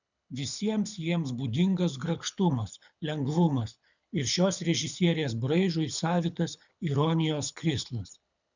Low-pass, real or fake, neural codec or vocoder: 7.2 kHz; fake; codec, 24 kHz, 6 kbps, HILCodec